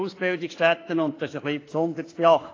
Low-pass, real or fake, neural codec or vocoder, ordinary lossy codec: 7.2 kHz; fake; autoencoder, 48 kHz, 32 numbers a frame, DAC-VAE, trained on Japanese speech; AAC, 32 kbps